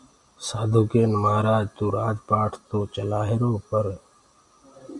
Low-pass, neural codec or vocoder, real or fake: 10.8 kHz; none; real